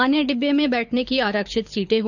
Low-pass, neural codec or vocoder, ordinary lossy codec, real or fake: 7.2 kHz; codec, 24 kHz, 6 kbps, HILCodec; none; fake